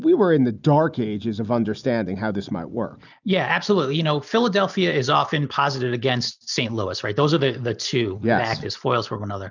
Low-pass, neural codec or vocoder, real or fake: 7.2 kHz; none; real